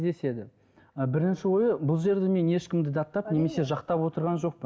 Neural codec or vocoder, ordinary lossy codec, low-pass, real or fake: none; none; none; real